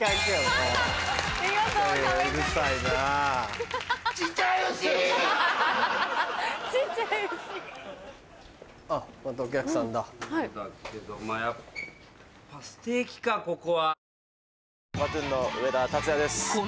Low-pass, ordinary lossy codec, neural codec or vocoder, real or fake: none; none; none; real